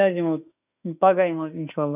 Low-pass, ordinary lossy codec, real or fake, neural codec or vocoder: 3.6 kHz; none; fake; autoencoder, 48 kHz, 32 numbers a frame, DAC-VAE, trained on Japanese speech